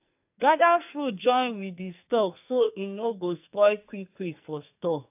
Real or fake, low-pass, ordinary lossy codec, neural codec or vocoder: fake; 3.6 kHz; AAC, 32 kbps; codec, 44.1 kHz, 2.6 kbps, SNAC